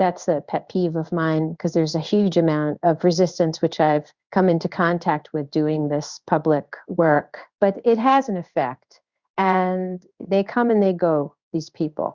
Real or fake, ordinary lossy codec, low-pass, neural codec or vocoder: fake; Opus, 64 kbps; 7.2 kHz; codec, 16 kHz in and 24 kHz out, 1 kbps, XY-Tokenizer